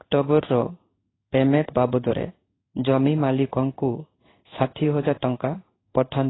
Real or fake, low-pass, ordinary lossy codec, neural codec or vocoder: fake; 7.2 kHz; AAC, 16 kbps; codec, 16 kHz in and 24 kHz out, 1 kbps, XY-Tokenizer